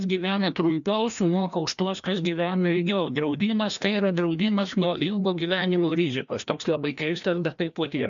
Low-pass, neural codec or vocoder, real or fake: 7.2 kHz; codec, 16 kHz, 1 kbps, FreqCodec, larger model; fake